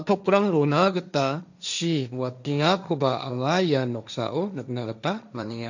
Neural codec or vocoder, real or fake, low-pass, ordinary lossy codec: codec, 16 kHz, 1.1 kbps, Voila-Tokenizer; fake; 7.2 kHz; none